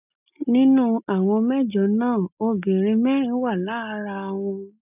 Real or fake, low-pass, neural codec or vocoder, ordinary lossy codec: real; 3.6 kHz; none; none